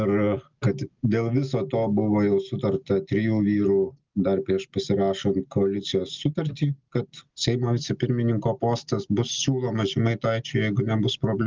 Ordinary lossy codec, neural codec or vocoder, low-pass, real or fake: Opus, 24 kbps; none; 7.2 kHz; real